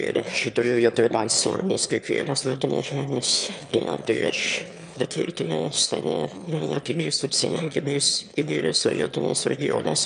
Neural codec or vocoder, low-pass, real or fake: autoencoder, 22.05 kHz, a latent of 192 numbers a frame, VITS, trained on one speaker; 9.9 kHz; fake